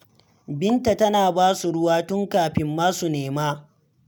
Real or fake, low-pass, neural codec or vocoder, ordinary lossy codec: real; none; none; none